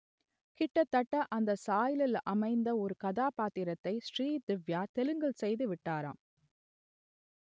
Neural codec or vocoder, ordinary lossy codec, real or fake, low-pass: none; none; real; none